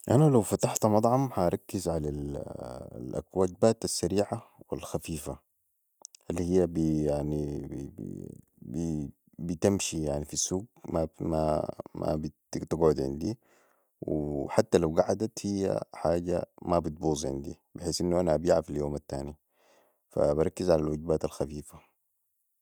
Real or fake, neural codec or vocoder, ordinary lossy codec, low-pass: real; none; none; none